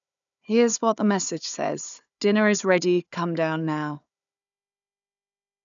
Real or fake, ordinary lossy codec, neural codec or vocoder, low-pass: fake; none; codec, 16 kHz, 4 kbps, FunCodec, trained on Chinese and English, 50 frames a second; 7.2 kHz